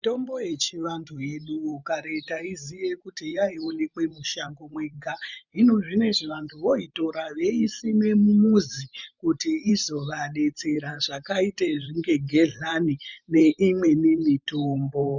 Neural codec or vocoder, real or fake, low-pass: vocoder, 44.1 kHz, 128 mel bands every 512 samples, BigVGAN v2; fake; 7.2 kHz